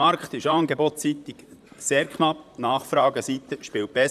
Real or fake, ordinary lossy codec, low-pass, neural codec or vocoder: fake; none; 14.4 kHz; vocoder, 44.1 kHz, 128 mel bands, Pupu-Vocoder